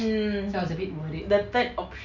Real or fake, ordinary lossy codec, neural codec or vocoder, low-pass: real; Opus, 64 kbps; none; 7.2 kHz